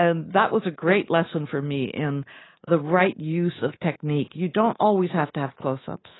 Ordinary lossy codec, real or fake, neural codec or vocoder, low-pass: AAC, 16 kbps; fake; codec, 24 kHz, 3.1 kbps, DualCodec; 7.2 kHz